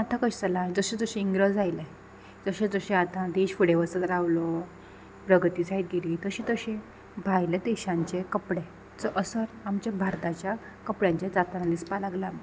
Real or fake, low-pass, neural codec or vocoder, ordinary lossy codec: real; none; none; none